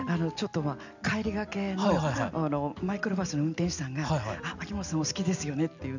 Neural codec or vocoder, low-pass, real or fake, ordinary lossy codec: none; 7.2 kHz; real; none